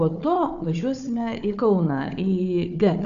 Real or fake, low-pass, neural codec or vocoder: fake; 7.2 kHz; codec, 16 kHz, 4 kbps, FunCodec, trained on Chinese and English, 50 frames a second